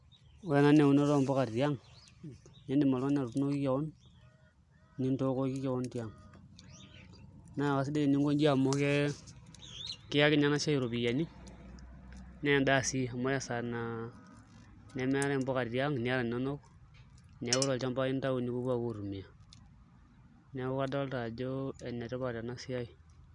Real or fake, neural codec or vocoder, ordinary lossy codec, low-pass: real; none; none; 10.8 kHz